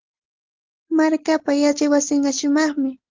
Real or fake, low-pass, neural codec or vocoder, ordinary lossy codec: real; 7.2 kHz; none; Opus, 16 kbps